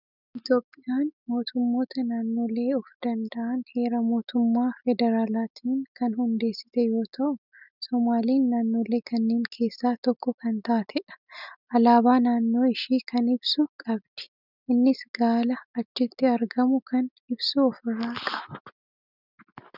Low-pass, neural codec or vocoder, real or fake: 5.4 kHz; none; real